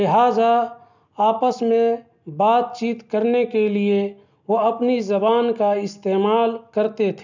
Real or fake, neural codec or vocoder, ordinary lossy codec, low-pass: real; none; none; 7.2 kHz